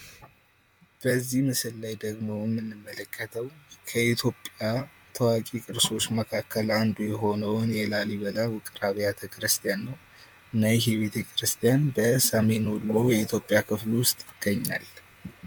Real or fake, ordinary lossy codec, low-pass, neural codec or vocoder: fake; MP3, 96 kbps; 19.8 kHz; vocoder, 44.1 kHz, 128 mel bands, Pupu-Vocoder